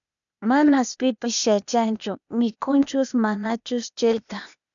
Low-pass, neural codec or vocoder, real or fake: 7.2 kHz; codec, 16 kHz, 0.8 kbps, ZipCodec; fake